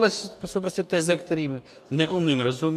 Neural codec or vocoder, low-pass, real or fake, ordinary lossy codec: codec, 44.1 kHz, 2.6 kbps, DAC; 14.4 kHz; fake; MP3, 96 kbps